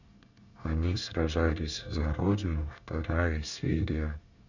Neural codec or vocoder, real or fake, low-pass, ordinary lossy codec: codec, 24 kHz, 1 kbps, SNAC; fake; 7.2 kHz; none